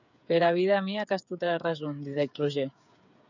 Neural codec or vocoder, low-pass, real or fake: codec, 16 kHz, 8 kbps, FreqCodec, smaller model; 7.2 kHz; fake